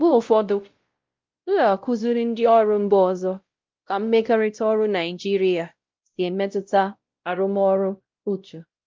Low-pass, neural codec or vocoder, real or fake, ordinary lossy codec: 7.2 kHz; codec, 16 kHz, 0.5 kbps, X-Codec, WavLM features, trained on Multilingual LibriSpeech; fake; Opus, 24 kbps